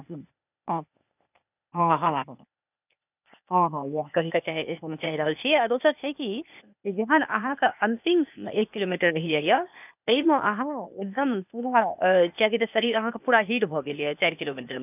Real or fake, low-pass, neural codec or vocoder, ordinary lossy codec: fake; 3.6 kHz; codec, 16 kHz, 0.8 kbps, ZipCodec; none